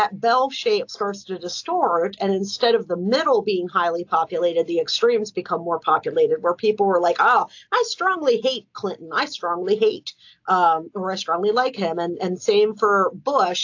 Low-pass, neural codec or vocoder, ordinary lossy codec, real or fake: 7.2 kHz; none; AAC, 48 kbps; real